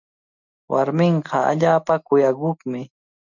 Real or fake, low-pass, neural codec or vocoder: real; 7.2 kHz; none